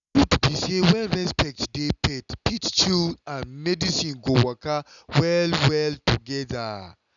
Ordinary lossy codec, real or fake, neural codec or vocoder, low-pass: none; real; none; 7.2 kHz